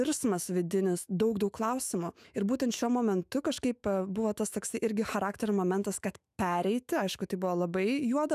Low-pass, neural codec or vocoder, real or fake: 14.4 kHz; autoencoder, 48 kHz, 128 numbers a frame, DAC-VAE, trained on Japanese speech; fake